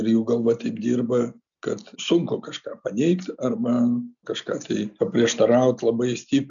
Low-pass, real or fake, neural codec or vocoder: 7.2 kHz; real; none